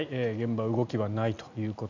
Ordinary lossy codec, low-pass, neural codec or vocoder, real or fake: none; 7.2 kHz; none; real